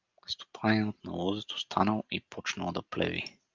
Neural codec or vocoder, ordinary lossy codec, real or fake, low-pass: none; Opus, 32 kbps; real; 7.2 kHz